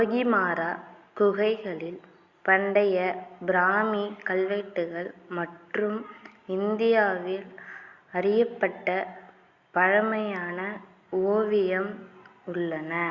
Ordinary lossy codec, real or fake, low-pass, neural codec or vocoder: Opus, 64 kbps; real; 7.2 kHz; none